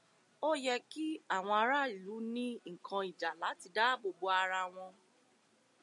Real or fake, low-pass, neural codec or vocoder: real; 10.8 kHz; none